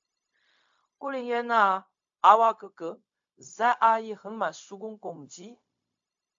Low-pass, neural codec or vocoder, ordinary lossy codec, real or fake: 7.2 kHz; codec, 16 kHz, 0.4 kbps, LongCat-Audio-Codec; AAC, 64 kbps; fake